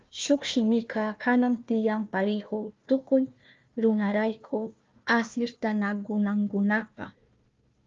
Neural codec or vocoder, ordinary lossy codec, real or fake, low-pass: codec, 16 kHz, 1 kbps, FunCodec, trained on Chinese and English, 50 frames a second; Opus, 32 kbps; fake; 7.2 kHz